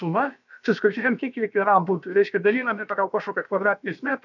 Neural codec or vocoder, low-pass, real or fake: codec, 16 kHz, about 1 kbps, DyCAST, with the encoder's durations; 7.2 kHz; fake